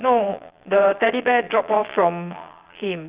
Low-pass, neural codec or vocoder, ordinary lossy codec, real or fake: 3.6 kHz; vocoder, 22.05 kHz, 80 mel bands, Vocos; Opus, 64 kbps; fake